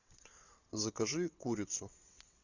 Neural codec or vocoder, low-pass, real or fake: vocoder, 22.05 kHz, 80 mel bands, WaveNeXt; 7.2 kHz; fake